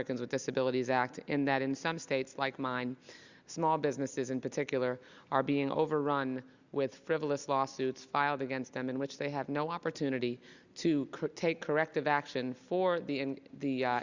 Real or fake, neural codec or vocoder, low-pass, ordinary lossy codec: real; none; 7.2 kHz; Opus, 64 kbps